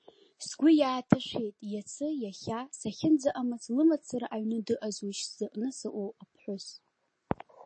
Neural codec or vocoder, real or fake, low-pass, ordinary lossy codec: none; real; 9.9 kHz; MP3, 32 kbps